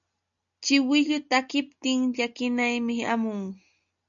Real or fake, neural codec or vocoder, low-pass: real; none; 7.2 kHz